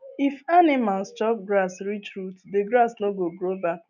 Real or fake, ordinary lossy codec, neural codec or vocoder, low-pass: real; none; none; 7.2 kHz